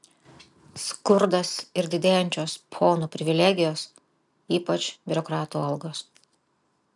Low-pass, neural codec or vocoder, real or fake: 10.8 kHz; none; real